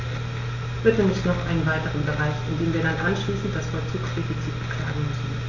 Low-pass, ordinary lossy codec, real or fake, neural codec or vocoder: 7.2 kHz; none; real; none